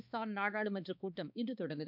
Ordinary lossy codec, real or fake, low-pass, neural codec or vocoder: none; fake; 5.4 kHz; codec, 16 kHz, 2 kbps, X-Codec, HuBERT features, trained on balanced general audio